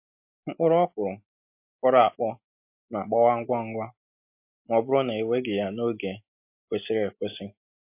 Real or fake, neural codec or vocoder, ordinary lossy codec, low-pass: real; none; MP3, 32 kbps; 3.6 kHz